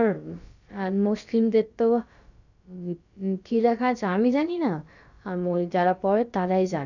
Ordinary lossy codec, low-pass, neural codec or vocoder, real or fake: none; 7.2 kHz; codec, 16 kHz, about 1 kbps, DyCAST, with the encoder's durations; fake